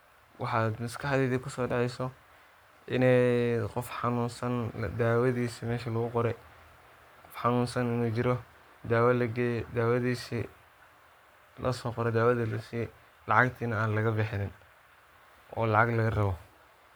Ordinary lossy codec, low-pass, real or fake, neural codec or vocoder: none; none; fake; codec, 44.1 kHz, 7.8 kbps, Pupu-Codec